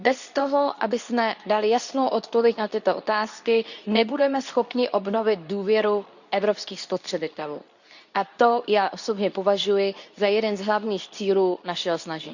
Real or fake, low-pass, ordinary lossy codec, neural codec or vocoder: fake; 7.2 kHz; none; codec, 24 kHz, 0.9 kbps, WavTokenizer, medium speech release version 2